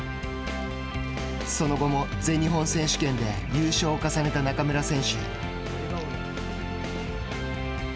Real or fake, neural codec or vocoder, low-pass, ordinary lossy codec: real; none; none; none